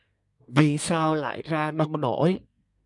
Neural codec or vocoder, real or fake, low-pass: codec, 24 kHz, 1 kbps, SNAC; fake; 10.8 kHz